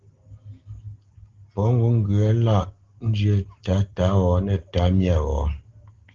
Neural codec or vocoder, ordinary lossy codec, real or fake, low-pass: none; Opus, 16 kbps; real; 7.2 kHz